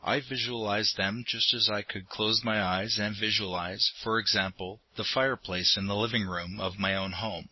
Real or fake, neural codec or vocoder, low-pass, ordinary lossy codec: real; none; 7.2 kHz; MP3, 24 kbps